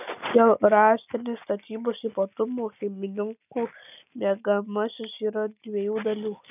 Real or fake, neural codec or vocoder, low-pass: real; none; 3.6 kHz